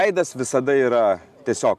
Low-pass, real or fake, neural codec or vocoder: 14.4 kHz; real; none